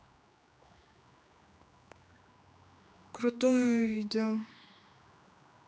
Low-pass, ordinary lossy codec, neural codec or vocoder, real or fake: none; none; codec, 16 kHz, 2 kbps, X-Codec, HuBERT features, trained on general audio; fake